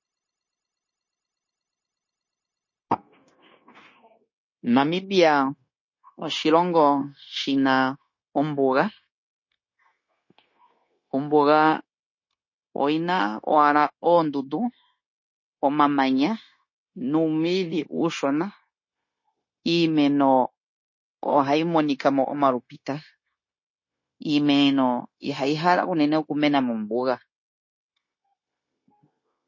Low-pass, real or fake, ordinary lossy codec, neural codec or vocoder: 7.2 kHz; fake; MP3, 32 kbps; codec, 16 kHz, 0.9 kbps, LongCat-Audio-Codec